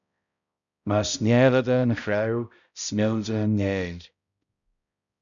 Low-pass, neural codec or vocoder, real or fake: 7.2 kHz; codec, 16 kHz, 0.5 kbps, X-Codec, HuBERT features, trained on balanced general audio; fake